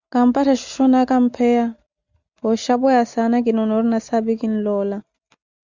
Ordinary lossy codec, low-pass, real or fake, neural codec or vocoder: Opus, 64 kbps; 7.2 kHz; real; none